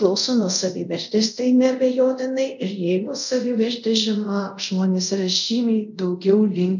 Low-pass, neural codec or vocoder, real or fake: 7.2 kHz; codec, 24 kHz, 0.5 kbps, DualCodec; fake